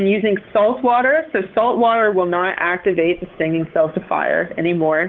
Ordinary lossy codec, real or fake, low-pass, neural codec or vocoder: Opus, 32 kbps; fake; 7.2 kHz; codec, 16 kHz, 8 kbps, FunCodec, trained on Chinese and English, 25 frames a second